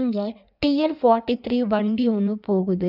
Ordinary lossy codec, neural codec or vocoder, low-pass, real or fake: none; codec, 16 kHz in and 24 kHz out, 1.1 kbps, FireRedTTS-2 codec; 5.4 kHz; fake